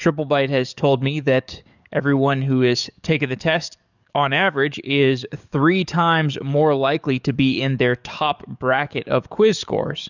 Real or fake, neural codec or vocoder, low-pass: fake; codec, 44.1 kHz, 7.8 kbps, DAC; 7.2 kHz